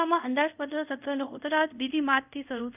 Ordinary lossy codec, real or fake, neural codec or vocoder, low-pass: none; fake; codec, 24 kHz, 0.5 kbps, DualCodec; 3.6 kHz